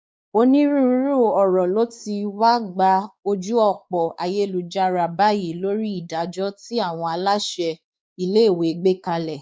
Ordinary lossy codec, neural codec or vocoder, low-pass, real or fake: none; codec, 16 kHz, 4 kbps, X-Codec, WavLM features, trained on Multilingual LibriSpeech; none; fake